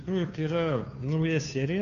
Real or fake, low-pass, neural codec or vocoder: fake; 7.2 kHz; codec, 16 kHz, 2 kbps, FunCodec, trained on Chinese and English, 25 frames a second